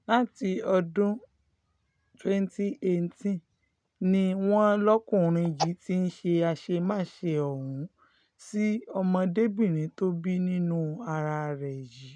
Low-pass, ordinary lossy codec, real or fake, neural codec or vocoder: 9.9 kHz; none; real; none